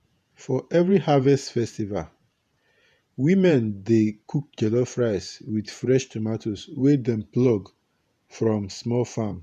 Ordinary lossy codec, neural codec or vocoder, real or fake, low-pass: none; vocoder, 48 kHz, 128 mel bands, Vocos; fake; 14.4 kHz